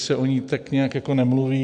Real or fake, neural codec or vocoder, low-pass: real; none; 10.8 kHz